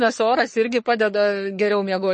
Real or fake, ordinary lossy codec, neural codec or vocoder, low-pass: fake; MP3, 32 kbps; codec, 44.1 kHz, 3.4 kbps, Pupu-Codec; 10.8 kHz